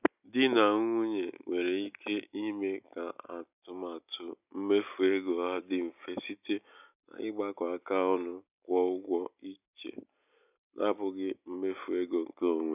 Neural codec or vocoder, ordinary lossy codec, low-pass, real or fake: none; none; 3.6 kHz; real